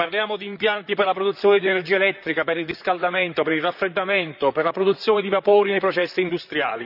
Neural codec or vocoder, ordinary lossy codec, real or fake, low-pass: vocoder, 44.1 kHz, 128 mel bands, Pupu-Vocoder; none; fake; 5.4 kHz